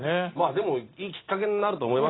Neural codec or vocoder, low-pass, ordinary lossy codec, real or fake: none; 7.2 kHz; AAC, 16 kbps; real